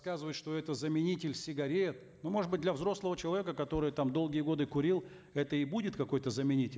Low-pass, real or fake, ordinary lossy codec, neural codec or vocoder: none; real; none; none